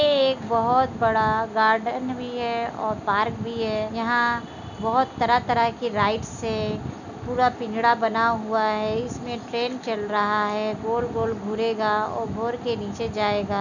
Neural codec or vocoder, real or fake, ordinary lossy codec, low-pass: none; real; none; 7.2 kHz